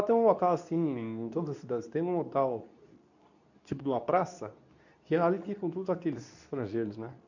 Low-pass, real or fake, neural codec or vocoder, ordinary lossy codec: 7.2 kHz; fake; codec, 24 kHz, 0.9 kbps, WavTokenizer, medium speech release version 2; none